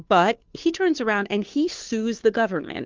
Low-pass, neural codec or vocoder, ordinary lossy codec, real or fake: 7.2 kHz; codec, 16 kHz, 2 kbps, FunCodec, trained on Chinese and English, 25 frames a second; Opus, 32 kbps; fake